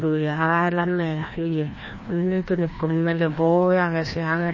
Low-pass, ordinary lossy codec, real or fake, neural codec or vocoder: 7.2 kHz; MP3, 32 kbps; fake; codec, 16 kHz, 1 kbps, FreqCodec, larger model